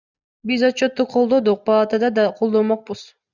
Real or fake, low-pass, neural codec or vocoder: real; 7.2 kHz; none